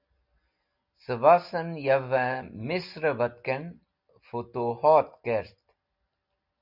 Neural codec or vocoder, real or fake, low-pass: none; real; 5.4 kHz